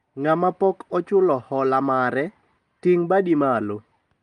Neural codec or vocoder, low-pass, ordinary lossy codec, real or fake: none; 9.9 kHz; Opus, 32 kbps; real